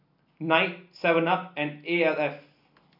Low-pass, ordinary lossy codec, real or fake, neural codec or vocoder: 5.4 kHz; none; real; none